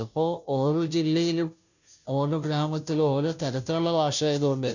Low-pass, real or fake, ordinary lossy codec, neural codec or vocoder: 7.2 kHz; fake; none; codec, 16 kHz, 0.5 kbps, FunCodec, trained on Chinese and English, 25 frames a second